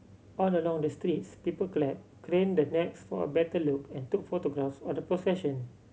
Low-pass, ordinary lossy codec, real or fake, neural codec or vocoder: none; none; real; none